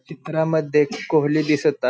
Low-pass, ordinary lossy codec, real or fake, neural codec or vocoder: none; none; real; none